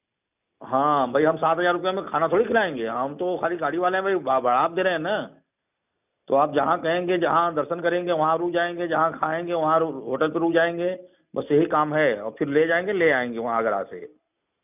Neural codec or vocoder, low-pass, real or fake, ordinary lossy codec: none; 3.6 kHz; real; none